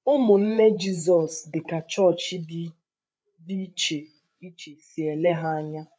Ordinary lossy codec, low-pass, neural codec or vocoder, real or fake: none; none; codec, 16 kHz, 8 kbps, FreqCodec, larger model; fake